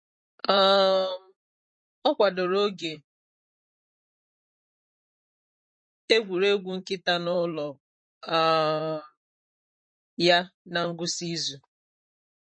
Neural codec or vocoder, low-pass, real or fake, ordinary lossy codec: vocoder, 44.1 kHz, 128 mel bands, Pupu-Vocoder; 9.9 kHz; fake; MP3, 32 kbps